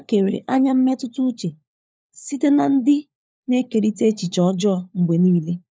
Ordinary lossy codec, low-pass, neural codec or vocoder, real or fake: none; none; codec, 16 kHz, 4 kbps, FunCodec, trained on LibriTTS, 50 frames a second; fake